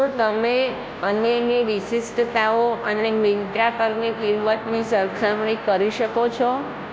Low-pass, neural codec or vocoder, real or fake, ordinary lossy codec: none; codec, 16 kHz, 0.5 kbps, FunCodec, trained on Chinese and English, 25 frames a second; fake; none